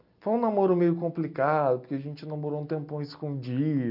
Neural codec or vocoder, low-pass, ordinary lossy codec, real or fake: none; 5.4 kHz; none; real